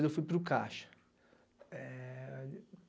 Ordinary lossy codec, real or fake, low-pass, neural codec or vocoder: none; real; none; none